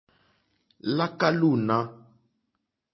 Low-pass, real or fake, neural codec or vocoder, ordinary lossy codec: 7.2 kHz; real; none; MP3, 24 kbps